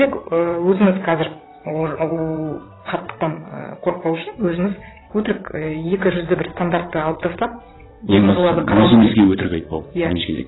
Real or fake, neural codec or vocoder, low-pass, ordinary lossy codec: fake; vocoder, 22.05 kHz, 80 mel bands, WaveNeXt; 7.2 kHz; AAC, 16 kbps